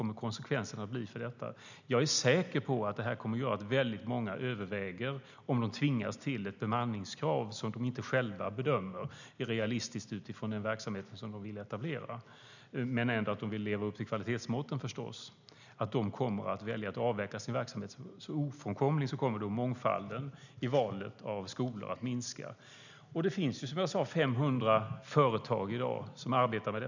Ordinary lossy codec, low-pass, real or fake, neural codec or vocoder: none; 7.2 kHz; real; none